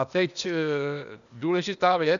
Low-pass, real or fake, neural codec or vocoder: 7.2 kHz; fake; codec, 16 kHz, 0.8 kbps, ZipCodec